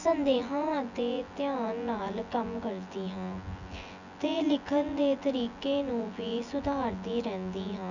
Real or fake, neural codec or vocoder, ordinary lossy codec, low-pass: fake; vocoder, 24 kHz, 100 mel bands, Vocos; none; 7.2 kHz